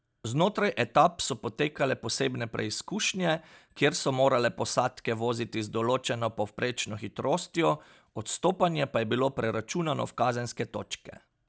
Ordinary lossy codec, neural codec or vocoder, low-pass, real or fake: none; none; none; real